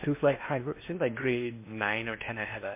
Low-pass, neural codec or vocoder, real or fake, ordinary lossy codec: 3.6 kHz; codec, 16 kHz in and 24 kHz out, 0.8 kbps, FocalCodec, streaming, 65536 codes; fake; MP3, 24 kbps